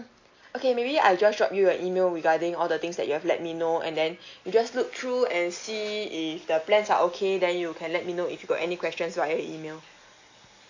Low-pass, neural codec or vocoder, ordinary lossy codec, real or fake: 7.2 kHz; none; AAC, 48 kbps; real